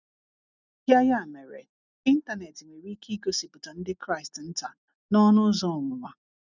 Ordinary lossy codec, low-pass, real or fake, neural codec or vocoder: none; 7.2 kHz; real; none